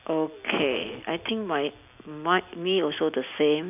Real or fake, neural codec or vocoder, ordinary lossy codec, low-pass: real; none; none; 3.6 kHz